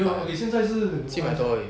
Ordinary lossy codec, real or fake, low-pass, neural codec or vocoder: none; real; none; none